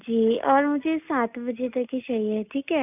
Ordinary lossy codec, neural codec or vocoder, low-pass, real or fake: none; none; 3.6 kHz; real